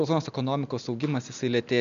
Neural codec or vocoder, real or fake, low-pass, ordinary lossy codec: none; real; 7.2 kHz; MP3, 64 kbps